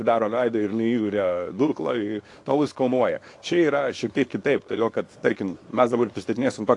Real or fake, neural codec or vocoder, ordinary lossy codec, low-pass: fake; codec, 24 kHz, 0.9 kbps, WavTokenizer, small release; AAC, 48 kbps; 10.8 kHz